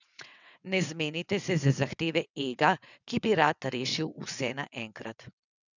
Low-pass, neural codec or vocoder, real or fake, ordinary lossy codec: 7.2 kHz; vocoder, 22.05 kHz, 80 mel bands, WaveNeXt; fake; none